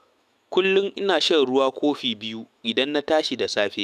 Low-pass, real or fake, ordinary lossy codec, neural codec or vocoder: 14.4 kHz; fake; none; autoencoder, 48 kHz, 128 numbers a frame, DAC-VAE, trained on Japanese speech